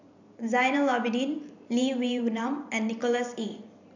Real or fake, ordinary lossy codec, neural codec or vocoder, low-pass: fake; none; vocoder, 44.1 kHz, 128 mel bands every 512 samples, BigVGAN v2; 7.2 kHz